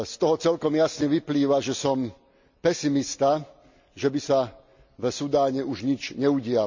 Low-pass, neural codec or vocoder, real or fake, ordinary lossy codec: 7.2 kHz; none; real; MP3, 64 kbps